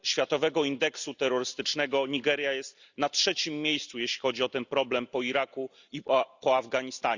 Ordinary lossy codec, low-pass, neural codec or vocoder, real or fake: Opus, 64 kbps; 7.2 kHz; none; real